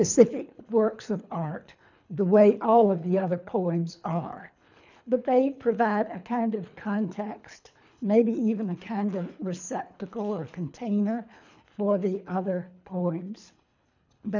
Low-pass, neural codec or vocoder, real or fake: 7.2 kHz; codec, 24 kHz, 3 kbps, HILCodec; fake